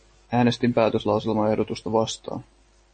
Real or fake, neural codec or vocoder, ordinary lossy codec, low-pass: real; none; MP3, 32 kbps; 9.9 kHz